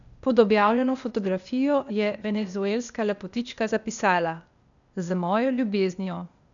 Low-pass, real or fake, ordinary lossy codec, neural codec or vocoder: 7.2 kHz; fake; none; codec, 16 kHz, 0.8 kbps, ZipCodec